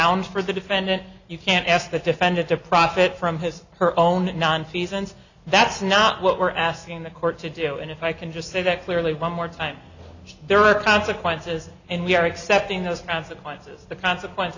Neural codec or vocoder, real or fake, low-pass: none; real; 7.2 kHz